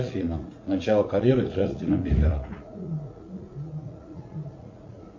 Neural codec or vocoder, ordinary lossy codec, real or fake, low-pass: vocoder, 44.1 kHz, 80 mel bands, Vocos; MP3, 48 kbps; fake; 7.2 kHz